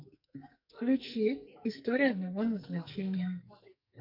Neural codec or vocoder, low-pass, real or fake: codec, 44.1 kHz, 2.6 kbps, SNAC; 5.4 kHz; fake